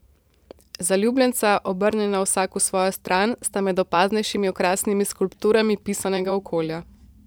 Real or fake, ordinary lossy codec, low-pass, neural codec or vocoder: fake; none; none; vocoder, 44.1 kHz, 128 mel bands every 512 samples, BigVGAN v2